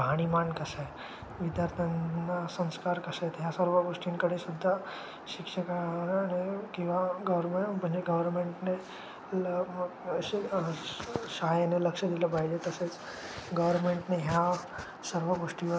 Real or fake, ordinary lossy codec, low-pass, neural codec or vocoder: real; none; none; none